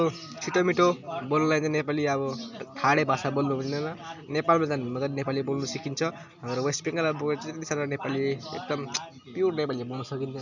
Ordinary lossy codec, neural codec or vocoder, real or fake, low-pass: none; none; real; 7.2 kHz